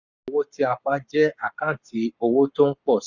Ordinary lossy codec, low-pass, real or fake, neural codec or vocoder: none; 7.2 kHz; fake; codec, 44.1 kHz, 7.8 kbps, Pupu-Codec